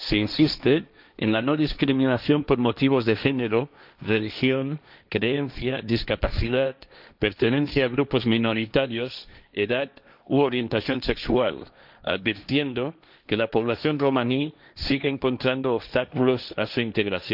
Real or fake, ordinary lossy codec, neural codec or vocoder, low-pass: fake; none; codec, 16 kHz, 1.1 kbps, Voila-Tokenizer; 5.4 kHz